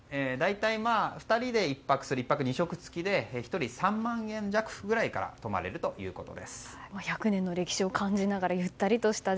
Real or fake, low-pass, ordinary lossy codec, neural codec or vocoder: real; none; none; none